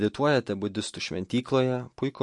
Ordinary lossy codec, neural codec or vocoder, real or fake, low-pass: MP3, 48 kbps; none; real; 10.8 kHz